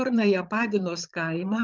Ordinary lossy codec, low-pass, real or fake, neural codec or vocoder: Opus, 32 kbps; 7.2 kHz; fake; vocoder, 22.05 kHz, 80 mel bands, Vocos